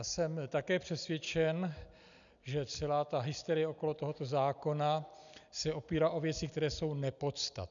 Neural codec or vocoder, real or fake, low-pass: none; real; 7.2 kHz